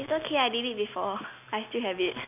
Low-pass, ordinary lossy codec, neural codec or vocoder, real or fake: 3.6 kHz; none; none; real